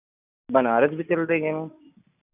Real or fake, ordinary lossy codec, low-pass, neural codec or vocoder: real; none; 3.6 kHz; none